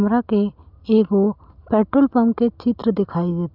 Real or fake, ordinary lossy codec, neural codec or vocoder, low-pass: real; none; none; 5.4 kHz